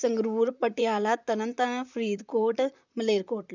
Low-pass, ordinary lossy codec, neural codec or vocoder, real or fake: 7.2 kHz; none; vocoder, 44.1 kHz, 128 mel bands, Pupu-Vocoder; fake